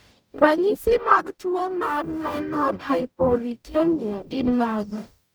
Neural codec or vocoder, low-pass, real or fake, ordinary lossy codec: codec, 44.1 kHz, 0.9 kbps, DAC; none; fake; none